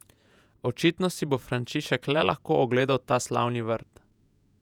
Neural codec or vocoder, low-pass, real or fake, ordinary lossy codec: vocoder, 48 kHz, 128 mel bands, Vocos; 19.8 kHz; fake; none